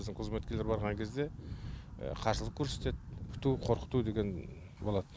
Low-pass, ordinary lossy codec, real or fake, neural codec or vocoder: none; none; real; none